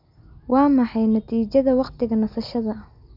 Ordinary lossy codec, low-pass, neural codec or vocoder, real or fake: none; 5.4 kHz; none; real